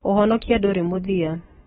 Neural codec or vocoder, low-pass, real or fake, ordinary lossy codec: autoencoder, 48 kHz, 128 numbers a frame, DAC-VAE, trained on Japanese speech; 19.8 kHz; fake; AAC, 16 kbps